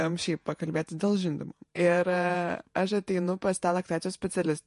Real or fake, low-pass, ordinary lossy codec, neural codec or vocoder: fake; 14.4 kHz; MP3, 48 kbps; vocoder, 48 kHz, 128 mel bands, Vocos